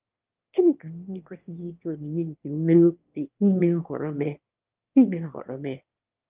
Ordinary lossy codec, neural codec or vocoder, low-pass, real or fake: Opus, 32 kbps; autoencoder, 22.05 kHz, a latent of 192 numbers a frame, VITS, trained on one speaker; 3.6 kHz; fake